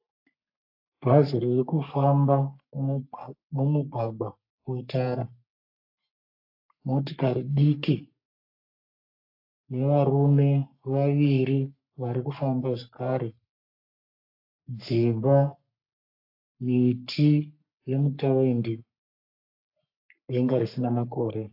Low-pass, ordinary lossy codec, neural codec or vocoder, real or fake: 5.4 kHz; AAC, 48 kbps; codec, 44.1 kHz, 3.4 kbps, Pupu-Codec; fake